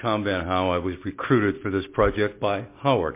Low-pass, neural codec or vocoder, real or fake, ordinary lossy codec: 3.6 kHz; none; real; MP3, 24 kbps